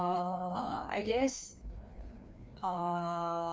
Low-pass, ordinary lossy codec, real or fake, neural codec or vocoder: none; none; fake; codec, 16 kHz, 2 kbps, FreqCodec, larger model